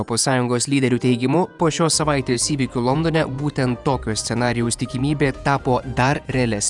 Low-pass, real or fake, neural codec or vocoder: 10.8 kHz; fake; vocoder, 48 kHz, 128 mel bands, Vocos